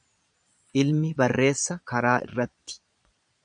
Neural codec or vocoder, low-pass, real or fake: vocoder, 22.05 kHz, 80 mel bands, Vocos; 9.9 kHz; fake